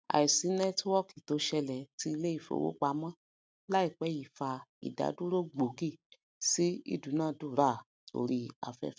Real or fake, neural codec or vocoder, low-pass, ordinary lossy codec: real; none; none; none